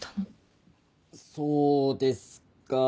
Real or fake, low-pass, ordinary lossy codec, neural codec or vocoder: real; none; none; none